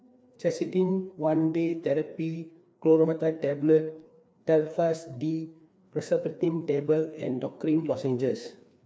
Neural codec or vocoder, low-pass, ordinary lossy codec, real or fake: codec, 16 kHz, 2 kbps, FreqCodec, larger model; none; none; fake